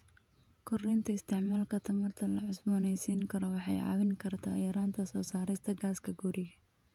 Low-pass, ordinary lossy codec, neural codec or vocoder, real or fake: 19.8 kHz; none; vocoder, 48 kHz, 128 mel bands, Vocos; fake